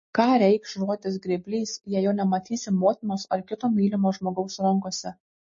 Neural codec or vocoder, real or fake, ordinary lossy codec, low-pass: none; real; MP3, 32 kbps; 7.2 kHz